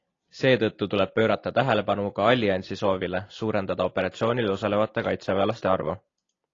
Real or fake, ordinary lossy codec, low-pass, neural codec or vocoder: real; AAC, 32 kbps; 7.2 kHz; none